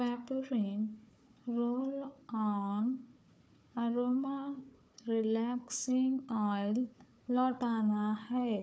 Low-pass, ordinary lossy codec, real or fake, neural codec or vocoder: none; none; fake; codec, 16 kHz, 4 kbps, FunCodec, trained on Chinese and English, 50 frames a second